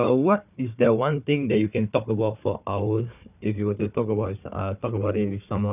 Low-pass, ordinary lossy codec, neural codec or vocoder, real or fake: 3.6 kHz; none; codec, 16 kHz, 4 kbps, FunCodec, trained on Chinese and English, 50 frames a second; fake